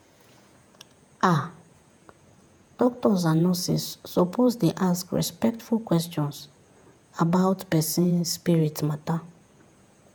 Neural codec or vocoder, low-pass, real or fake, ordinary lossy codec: vocoder, 48 kHz, 128 mel bands, Vocos; none; fake; none